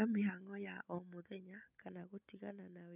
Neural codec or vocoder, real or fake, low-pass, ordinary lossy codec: none; real; 3.6 kHz; none